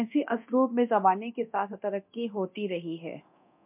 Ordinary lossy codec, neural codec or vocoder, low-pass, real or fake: MP3, 32 kbps; codec, 24 kHz, 0.9 kbps, DualCodec; 3.6 kHz; fake